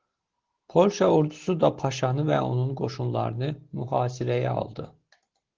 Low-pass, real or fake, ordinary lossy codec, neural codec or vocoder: 7.2 kHz; real; Opus, 16 kbps; none